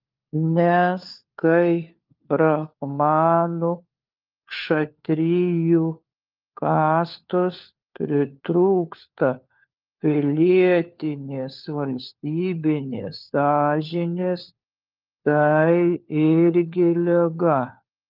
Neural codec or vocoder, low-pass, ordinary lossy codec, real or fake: codec, 16 kHz, 4 kbps, FunCodec, trained on LibriTTS, 50 frames a second; 5.4 kHz; Opus, 24 kbps; fake